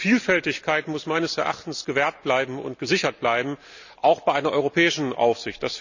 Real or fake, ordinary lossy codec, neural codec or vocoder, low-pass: real; none; none; 7.2 kHz